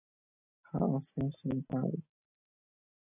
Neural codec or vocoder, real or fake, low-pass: none; real; 3.6 kHz